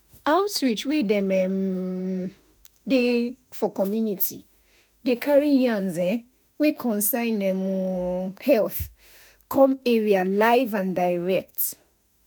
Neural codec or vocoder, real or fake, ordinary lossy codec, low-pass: autoencoder, 48 kHz, 32 numbers a frame, DAC-VAE, trained on Japanese speech; fake; none; none